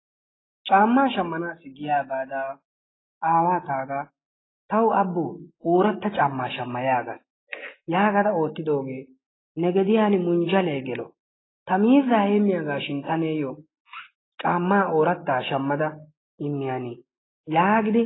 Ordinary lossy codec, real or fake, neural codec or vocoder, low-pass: AAC, 16 kbps; real; none; 7.2 kHz